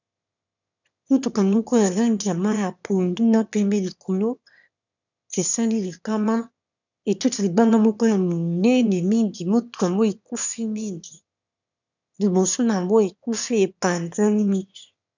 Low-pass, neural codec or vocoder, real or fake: 7.2 kHz; autoencoder, 22.05 kHz, a latent of 192 numbers a frame, VITS, trained on one speaker; fake